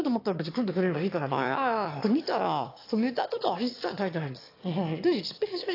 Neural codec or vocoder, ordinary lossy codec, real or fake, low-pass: autoencoder, 22.05 kHz, a latent of 192 numbers a frame, VITS, trained on one speaker; AAC, 32 kbps; fake; 5.4 kHz